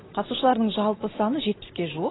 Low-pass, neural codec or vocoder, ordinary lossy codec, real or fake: 7.2 kHz; none; AAC, 16 kbps; real